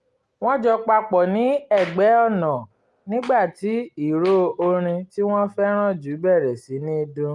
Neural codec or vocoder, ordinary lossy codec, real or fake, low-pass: none; Opus, 32 kbps; real; 10.8 kHz